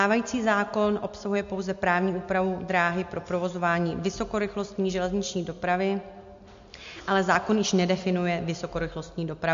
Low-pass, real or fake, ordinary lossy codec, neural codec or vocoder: 7.2 kHz; real; MP3, 48 kbps; none